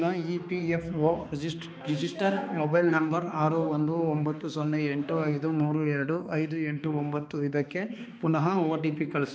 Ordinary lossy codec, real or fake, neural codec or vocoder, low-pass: none; fake; codec, 16 kHz, 2 kbps, X-Codec, HuBERT features, trained on balanced general audio; none